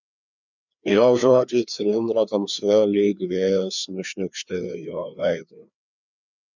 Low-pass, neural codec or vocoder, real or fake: 7.2 kHz; codec, 16 kHz, 2 kbps, FreqCodec, larger model; fake